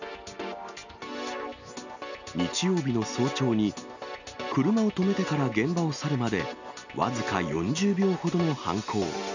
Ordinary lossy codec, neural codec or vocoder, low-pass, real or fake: none; none; 7.2 kHz; real